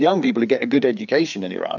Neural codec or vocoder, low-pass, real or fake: codec, 16 kHz, 4 kbps, FreqCodec, larger model; 7.2 kHz; fake